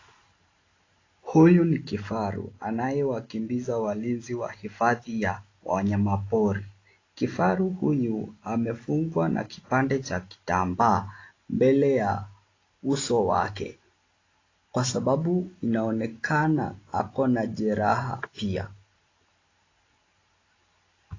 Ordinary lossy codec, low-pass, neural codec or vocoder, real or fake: AAC, 32 kbps; 7.2 kHz; none; real